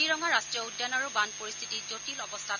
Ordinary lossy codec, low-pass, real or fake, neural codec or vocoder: none; 7.2 kHz; real; none